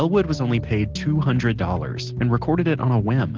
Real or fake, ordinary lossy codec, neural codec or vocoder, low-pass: real; Opus, 16 kbps; none; 7.2 kHz